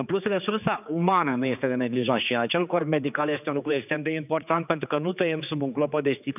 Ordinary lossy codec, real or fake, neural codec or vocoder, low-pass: none; fake; codec, 16 kHz, 4 kbps, X-Codec, HuBERT features, trained on general audio; 3.6 kHz